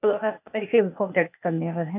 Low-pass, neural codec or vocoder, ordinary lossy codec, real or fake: 3.6 kHz; codec, 16 kHz, 0.8 kbps, ZipCodec; none; fake